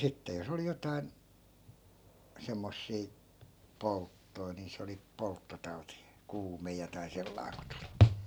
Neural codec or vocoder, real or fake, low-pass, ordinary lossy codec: none; real; none; none